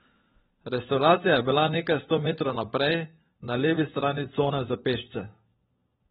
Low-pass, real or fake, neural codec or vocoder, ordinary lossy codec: 7.2 kHz; fake; codec, 16 kHz, 16 kbps, FunCodec, trained on LibriTTS, 50 frames a second; AAC, 16 kbps